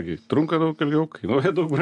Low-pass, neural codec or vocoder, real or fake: 10.8 kHz; none; real